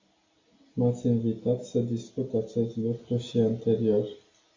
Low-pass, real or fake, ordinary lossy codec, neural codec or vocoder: 7.2 kHz; real; AAC, 32 kbps; none